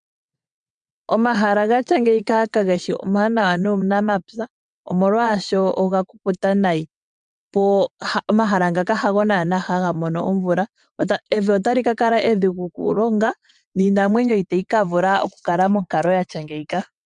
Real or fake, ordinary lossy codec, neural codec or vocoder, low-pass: fake; MP3, 96 kbps; vocoder, 22.05 kHz, 80 mel bands, WaveNeXt; 9.9 kHz